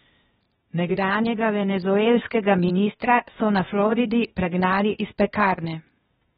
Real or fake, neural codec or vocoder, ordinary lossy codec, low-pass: fake; codec, 16 kHz, 0.8 kbps, ZipCodec; AAC, 16 kbps; 7.2 kHz